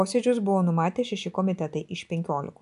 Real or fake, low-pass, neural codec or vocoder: real; 10.8 kHz; none